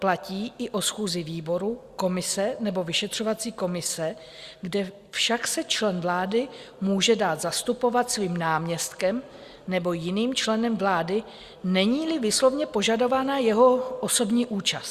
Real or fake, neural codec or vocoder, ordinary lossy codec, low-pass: real; none; Opus, 64 kbps; 14.4 kHz